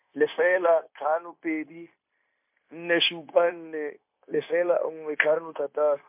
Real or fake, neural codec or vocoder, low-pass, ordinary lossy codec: fake; codec, 16 kHz, 0.9 kbps, LongCat-Audio-Codec; 3.6 kHz; MP3, 32 kbps